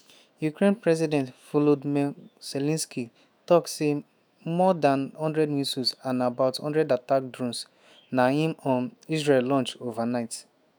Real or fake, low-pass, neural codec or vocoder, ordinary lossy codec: fake; none; autoencoder, 48 kHz, 128 numbers a frame, DAC-VAE, trained on Japanese speech; none